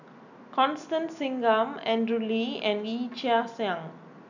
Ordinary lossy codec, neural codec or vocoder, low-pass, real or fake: none; none; 7.2 kHz; real